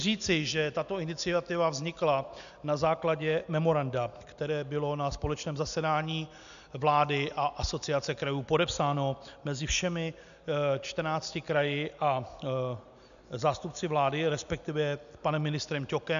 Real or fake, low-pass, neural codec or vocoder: real; 7.2 kHz; none